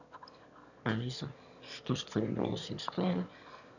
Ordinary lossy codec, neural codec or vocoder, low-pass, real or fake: none; autoencoder, 22.05 kHz, a latent of 192 numbers a frame, VITS, trained on one speaker; 7.2 kHz; fake